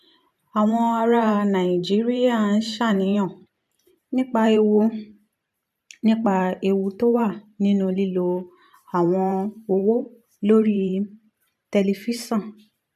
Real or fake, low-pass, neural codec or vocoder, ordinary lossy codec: fake; 14.4 kHz; vocoder, 44.1 kHz, 128 mel bands every 512 samples, BigVGAN v2; MP3, 96 kbps